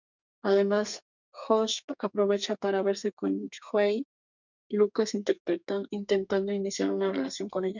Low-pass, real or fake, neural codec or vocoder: 7.2 kHz; fake; codec, 32 kHz, 1.9 kbps, SNAC